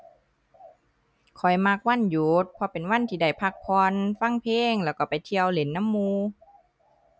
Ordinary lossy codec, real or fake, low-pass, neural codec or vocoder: none; real; none; none